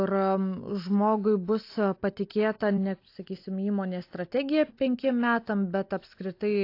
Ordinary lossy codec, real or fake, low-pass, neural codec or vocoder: AAC, 32 kbps; real; 5.4 kHz; none